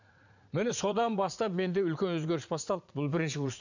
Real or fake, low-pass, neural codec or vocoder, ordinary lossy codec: fake; 7.2 kHz; vocoder, 44.1 kHz, 80 mel bands, Vocos; none